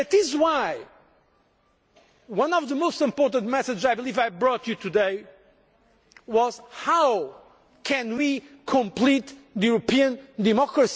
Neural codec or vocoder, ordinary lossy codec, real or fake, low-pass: none; none; real; none